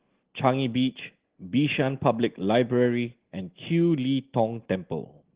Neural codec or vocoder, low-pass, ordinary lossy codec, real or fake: none; 3.6 kHz; Opus, 16 kbps; real